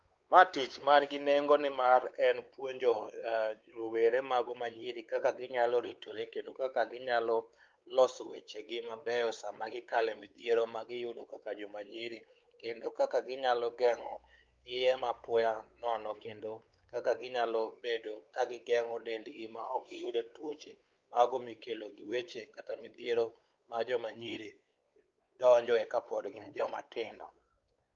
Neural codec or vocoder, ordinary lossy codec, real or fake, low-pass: codec, 16 kHz, 4 kbps, X-Codec, WavLM features, trained on Multilingual LibriSpeech; Opus, 32 kbps; fake; 7.2 kHz